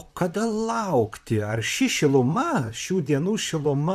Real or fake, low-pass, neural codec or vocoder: fake; 14.4 kHz; vocoder, 44.1 kHz, 128 mel bands, Pupu-Vocoder